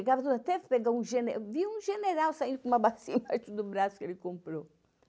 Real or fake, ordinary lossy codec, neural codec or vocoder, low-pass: real; none; none; none